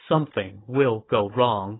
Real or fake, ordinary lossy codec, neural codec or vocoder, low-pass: fake; AAC, 16 kbps; codec, 16 kHz, 4 kbps, FunCodec, trained on Chinese and English, 50 frames a second; 7.2 kHz